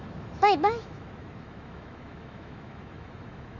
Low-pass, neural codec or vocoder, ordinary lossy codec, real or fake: 7.2 kHz; autoencoder, 48 kHz, 128 numbers a frame, DAC-VAE, trained on Japanese speech; none; fake